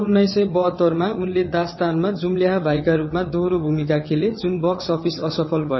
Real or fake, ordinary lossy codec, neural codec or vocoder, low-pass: fake; MP3, 24 kbps; vocoder, 22.05 kHz, 80 mel bands, WaveNeXt; 7.2 kHz